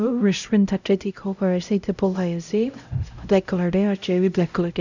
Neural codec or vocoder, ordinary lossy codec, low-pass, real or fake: codec, 16 kHz, 0.5 kbps, X-Codec, HuBERT features, trained on LibriSpeech; none; 7.2 kHz; fake